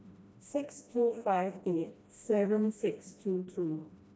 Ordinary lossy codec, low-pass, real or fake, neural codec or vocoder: none; none; fake; codec, 16 kHz, 1 kbps, FreqCodec, smaller model